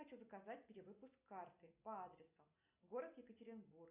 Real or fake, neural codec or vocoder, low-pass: real; none; 3.6 kHz